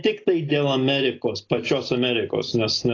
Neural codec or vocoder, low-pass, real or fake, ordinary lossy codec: none; 7.2 kHz; real; AAC, 32 kbps